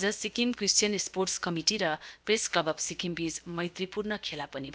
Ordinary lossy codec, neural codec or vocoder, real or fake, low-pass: none; codec, 16 kHz, about 1 kbps, DyCAST, with the encoder's durations; fake; none